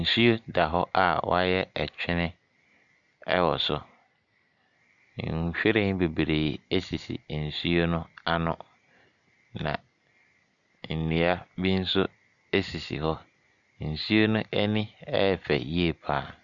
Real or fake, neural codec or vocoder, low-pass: real; none; 7.2 kHz